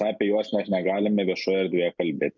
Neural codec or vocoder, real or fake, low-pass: none; real; 7.2 kHz